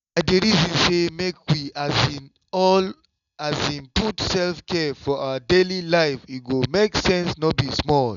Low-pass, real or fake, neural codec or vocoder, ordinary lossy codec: 7.2 kHz; real; none; none